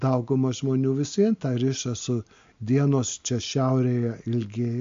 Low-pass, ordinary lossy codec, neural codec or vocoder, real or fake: 7.2 kHz; MP3, 48 kbps; none; real